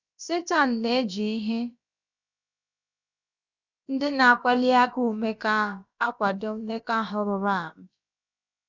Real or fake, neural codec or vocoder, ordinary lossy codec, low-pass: fake; codec, 16 kHz, about 1 kbps, DyCAST, with the encoder's durations; none; 7.2 kHz